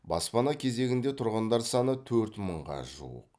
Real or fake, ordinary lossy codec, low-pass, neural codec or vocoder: real; none; none; none